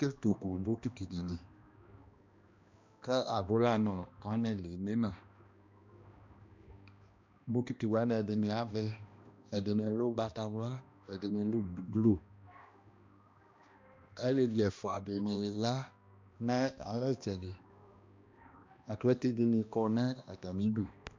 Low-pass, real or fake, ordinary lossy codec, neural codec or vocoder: 7.2 kHz; fake; MP3, 64 kbps; codec, 16 kHz, 1 kbps, X-Codec, HuBERT features, trained on balanced general audio